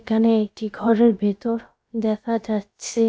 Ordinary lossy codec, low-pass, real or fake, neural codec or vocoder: none; none; fake; codec, 16 kHz, about 1 kbps, DyCAST, with the encoder's durations